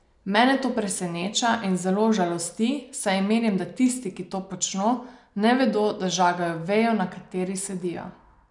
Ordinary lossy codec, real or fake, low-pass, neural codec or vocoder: none; real; 10.8 kHz; none